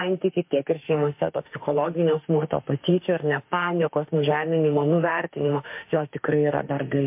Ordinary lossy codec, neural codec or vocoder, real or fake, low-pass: MP3, 32 kbps; autoencoder, 48 kHz, 32 numbers a frame, DAC-VAE, trained on Japanese speech; fake; 3.6 kHz